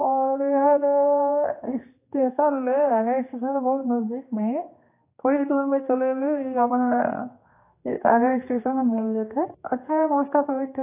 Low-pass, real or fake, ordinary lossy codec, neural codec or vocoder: 3.6 kHz; fake; AAC, 24 kbps; codec, 16 kHz, 2 kbps, X-Codec, HuBERT features, trained on general audio